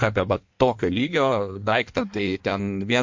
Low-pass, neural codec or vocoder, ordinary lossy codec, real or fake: 7.2 kHz; codec, 16 kHz in and 24 kHz out, 1.1 kbps, FireRedTTS-2 codec; MP3, 48 kbps; fake